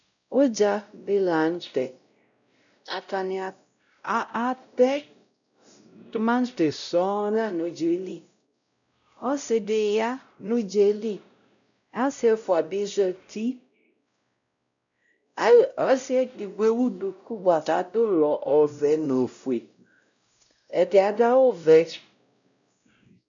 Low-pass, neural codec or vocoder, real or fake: 7.2 kHz; codec, 16 kHz, 0.5 kbps, X-Codec, WavLM features, trained on Multilingual LibriSpeech; fake